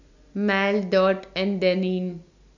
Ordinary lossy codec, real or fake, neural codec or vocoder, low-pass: none; real; none; 7.2 kHz